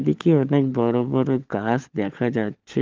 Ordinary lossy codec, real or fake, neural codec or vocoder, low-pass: Opus, 32 kbps; fake; codec, 16 kHz, 16 kbps, FunCodec, trained on LibriTTS, 50 frames a second; 7.2 kHz